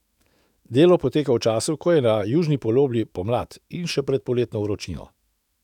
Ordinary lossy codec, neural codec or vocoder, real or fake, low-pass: none; autoencoder, 48 kHz, 128 numbers a frame, DAC-VAE, trained on Japanese speech; fake; 19.8 kHz